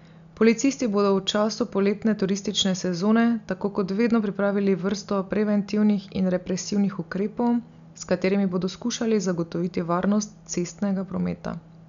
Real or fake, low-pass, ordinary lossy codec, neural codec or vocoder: real; 7.2 kHz; none; none